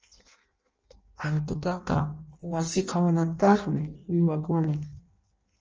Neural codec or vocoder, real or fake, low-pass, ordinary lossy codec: codec, 16 kHz in and 24 kHz out, 0.6 kbps, FireRedTTS-2 codec; fake; 7.2 kHz; Opus, 24 kbps